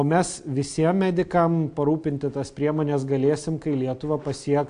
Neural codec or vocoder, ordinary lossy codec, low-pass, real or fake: none; AAC, 64 kbps; 9.9 kHz; real